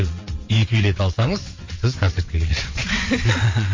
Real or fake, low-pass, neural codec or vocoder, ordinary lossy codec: fake; 7.2 kHz; vocoder, 22.05 kHz, 80 mel bands, WaveNeXt; MP3, 32 kbps